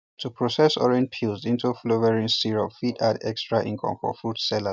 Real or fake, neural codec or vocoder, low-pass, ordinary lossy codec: real; none; none; none